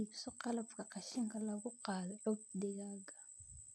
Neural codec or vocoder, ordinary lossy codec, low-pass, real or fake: none; none; none; real